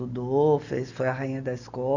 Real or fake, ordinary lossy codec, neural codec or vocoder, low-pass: real; none; none; 7.2 kHz